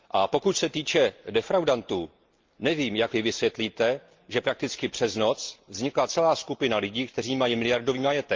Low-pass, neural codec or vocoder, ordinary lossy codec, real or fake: 7.2 kHz; none; Opus, 32 kbps; real